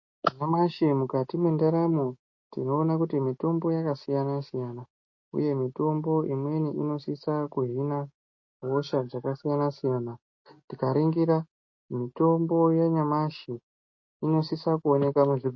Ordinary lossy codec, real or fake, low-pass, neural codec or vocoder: MP3, 32 kbps; real; 7.2 kHz; none